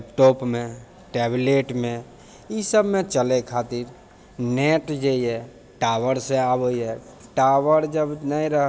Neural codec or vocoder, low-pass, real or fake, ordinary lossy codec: none; none; real; none